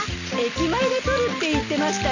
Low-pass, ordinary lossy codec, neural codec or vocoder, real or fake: 7.2 kHz; none; none; real